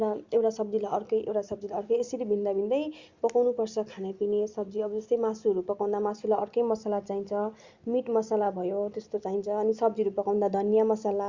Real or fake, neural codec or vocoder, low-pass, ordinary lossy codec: real; none; 7.2 kHz; Opus, 64 kbps